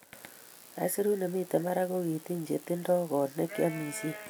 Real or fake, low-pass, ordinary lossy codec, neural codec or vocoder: real; none; none; none